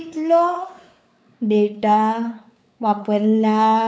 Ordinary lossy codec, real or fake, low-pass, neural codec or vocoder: none; fake; none; codec, 16 kHz, 4 kbps, X-Codec, WavLM features, trained on Multilingual LibriSpeech